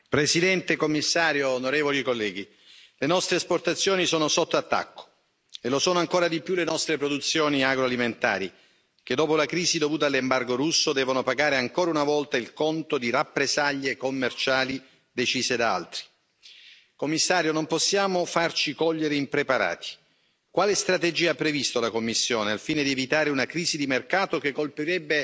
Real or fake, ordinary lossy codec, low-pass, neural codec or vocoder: real; none; none; none